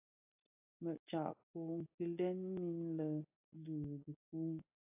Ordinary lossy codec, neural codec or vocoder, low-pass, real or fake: AAC, 32 kbps; none; 3.6 kHz; real